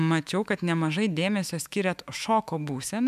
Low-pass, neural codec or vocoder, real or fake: 14.4 kHz; autoencoder, 48 kHz, 128 numbers a frame, DAC-VAE, trained on Japanese speech; fake